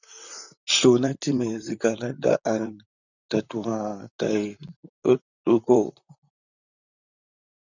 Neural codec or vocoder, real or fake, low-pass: vocoder, 44.1 kHz, 128 mel bands, Pupu-Vocoder; fake; 7.2 kHz